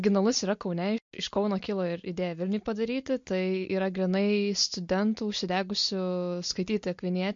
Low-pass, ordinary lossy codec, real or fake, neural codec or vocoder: 7.2 kHz; MP3, 48 kbps; real; none